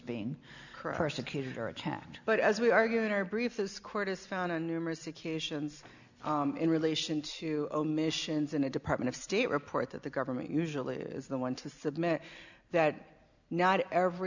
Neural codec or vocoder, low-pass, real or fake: none; 7.2 kHz; real